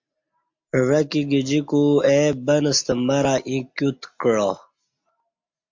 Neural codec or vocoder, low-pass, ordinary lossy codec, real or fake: none; 7.2 kHz; MP3, 48 kbps; real